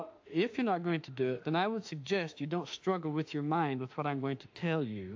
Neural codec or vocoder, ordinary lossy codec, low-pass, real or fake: autoencoder, 48 kHz, 32 numbers a frame, DAC-VAE, trained on Japanese speech; AAC, 48 kbps; 7.2 kHz; fake